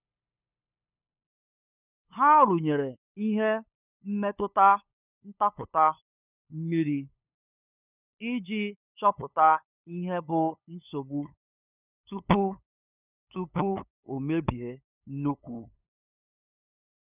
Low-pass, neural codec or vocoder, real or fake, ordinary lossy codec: 3.6 kHz; codec, 16 kHz, 8 kbps, FunCodec, trained on LibriTTS, 25 frames a second; fake; none